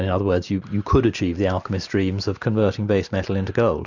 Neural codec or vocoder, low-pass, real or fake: none; 7.2 kHz; real